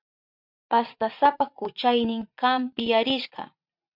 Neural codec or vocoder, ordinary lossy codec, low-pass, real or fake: vocoder, 44.1 kHz, 80 mel bands, Vocos; MP3, 48 kbps; 5.4 kHz; fake